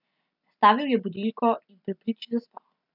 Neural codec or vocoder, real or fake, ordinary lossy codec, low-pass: none; real; none; 5.4 kHz